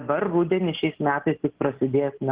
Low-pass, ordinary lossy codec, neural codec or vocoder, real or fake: 3.6 kHz; Opus, 16 kbps; none; real